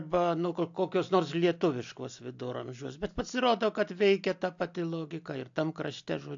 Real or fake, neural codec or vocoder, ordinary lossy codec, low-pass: real; none; AAC, 64 kbps; 7.2 kHz